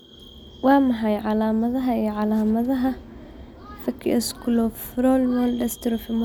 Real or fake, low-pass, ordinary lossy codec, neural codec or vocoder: real; none; none; none